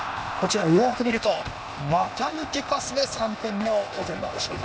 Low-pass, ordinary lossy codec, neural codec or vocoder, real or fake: none; none; codec, 16 kHz, 0.8 kbps, ZipCodec; fake